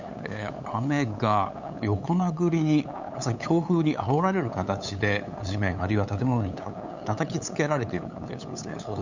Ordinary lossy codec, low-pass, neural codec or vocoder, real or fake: none; 7.2 kHz; codec, 16 kHz, 8 kbps, FunCodec, trained on LibriTTS, 25 frames a second; fake